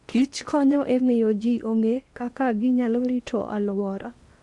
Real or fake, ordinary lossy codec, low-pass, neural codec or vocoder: fake; Opus, 64 kbps; 10.8 kHz; codec, 16 kHz in and 24 kHz out, 0.8 kbps, FocalCodec, streaming, 65536 codes